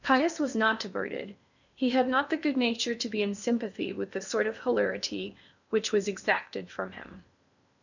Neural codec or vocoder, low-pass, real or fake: codec, 16 kHz in and 24 kHz out, 0.8 kbps, FocalCodec, streaming, 65536 codes; 7.2 kHz; fake